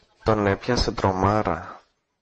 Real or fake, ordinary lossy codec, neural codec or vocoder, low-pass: fake; MP3, 32 kbps; vocoder, 48 kHz, 128 mel bands, Vocos; 10.8 kHz